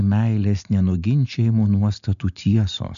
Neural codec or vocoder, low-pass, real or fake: none; 7.2 kHz; real